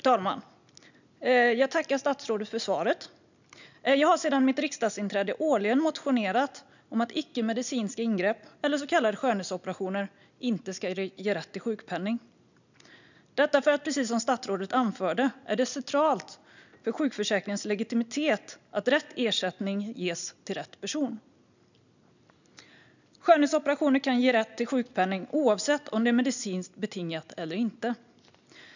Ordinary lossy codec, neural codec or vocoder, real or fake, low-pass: none; none; real; 7.2 kHz